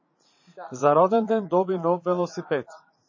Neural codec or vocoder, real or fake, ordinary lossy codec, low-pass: vocoder, 44.1 kHz, 80 mel bands, Vocos; fake; MP3, 32 kbps; 7.2 kHz